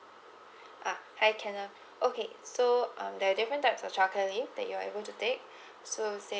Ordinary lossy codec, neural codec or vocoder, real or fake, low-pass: none; none; real; none